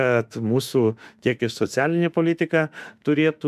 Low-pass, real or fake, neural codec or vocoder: 14.4 kHz; fake; autoencoder, 48 kHz, 32 numbers a frame, DAC-VAE, trained on Japanese speech